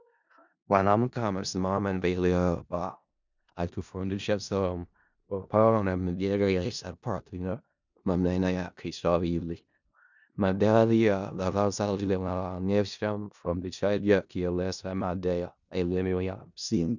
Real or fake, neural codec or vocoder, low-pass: fake; codec, 16 kHz in and 24 kHz out, 0.4 kbps, LongCat-Audio-Codec, four codebook decoder; 7.2 kHz